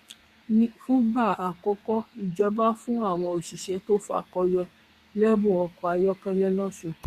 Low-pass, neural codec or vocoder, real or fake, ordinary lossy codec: 14.4 kHz; codec, 32 kHz, 1.9 kbps, SNAC; fake; Opus, 64 kbps